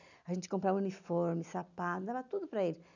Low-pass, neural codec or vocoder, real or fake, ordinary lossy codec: 7.2 kHz; none; real; none